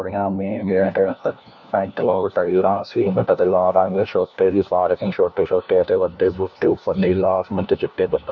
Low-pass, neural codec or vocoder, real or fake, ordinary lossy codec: 7.2 kHz; codec, 16 kHz, 1 kbps, FunCodec, trained on LibriTTS, 50 frames a second; fake; none